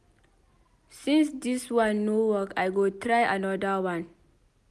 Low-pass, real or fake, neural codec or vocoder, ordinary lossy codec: none; real; none; none